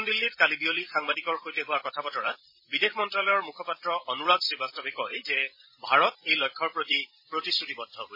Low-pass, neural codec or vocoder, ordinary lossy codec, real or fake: 5.4 kHz; vocoder, 44.1 kHz, 128 mel bands every 256 samples, BigVGAN v2; MP3, 24 kbps; fake